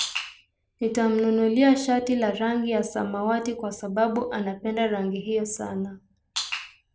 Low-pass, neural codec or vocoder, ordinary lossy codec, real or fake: none; none; none; real